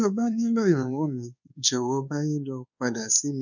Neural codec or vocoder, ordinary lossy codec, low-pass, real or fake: codec, 24 kHz, 1.2 kbps, DualCodec; none; 7.2 kHz; fake